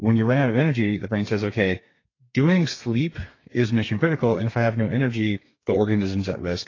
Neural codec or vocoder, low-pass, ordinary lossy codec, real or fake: codec, 32 kHz, 1.9 kbps, SNAC; 7.2 kHz; AAC, 32 kbps; fake